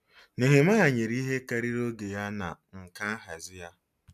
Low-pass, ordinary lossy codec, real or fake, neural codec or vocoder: 14.4 kHz; none; real; none